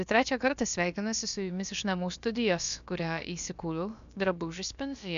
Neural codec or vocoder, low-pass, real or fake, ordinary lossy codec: codec, 16 kHz, about 1 kbps, DyCAST, with the encoder's durations; 7.2 kHz; fake; AAC, 96 kbps